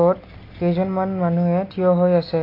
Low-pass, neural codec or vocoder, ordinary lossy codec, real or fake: 5.4 kHz; none; AAC, 32 kbps; real